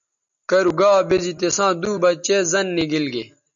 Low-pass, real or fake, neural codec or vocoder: 7.2 kHz; real; none